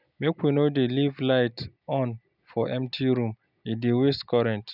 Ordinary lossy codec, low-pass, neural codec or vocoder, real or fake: none; 5.4 kHz; none; real